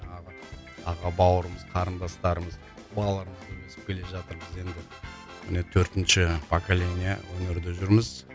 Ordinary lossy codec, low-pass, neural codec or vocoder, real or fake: none; none; none; real